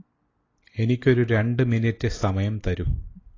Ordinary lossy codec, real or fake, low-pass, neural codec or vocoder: AAC, 32 kbps; real; 7.2 kHz; none